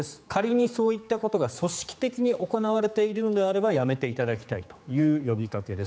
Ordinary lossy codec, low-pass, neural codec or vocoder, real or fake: none; none; codec, 16 kHz, 4 kbps, X-Codec, HuBERT features, trained on general audio; fake